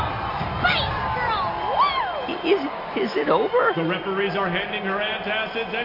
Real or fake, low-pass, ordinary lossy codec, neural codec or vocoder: real; 5.4 kHz; MP3, 32 kbps; none